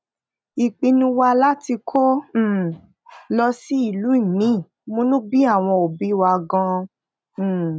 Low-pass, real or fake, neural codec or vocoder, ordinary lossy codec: none; real; none; none